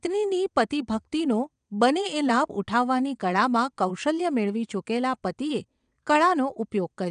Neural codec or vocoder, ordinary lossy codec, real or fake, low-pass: vocoder, 22.05 kHz, 80 mel bands, WaveNeXt; none; fake; 9.9 kHz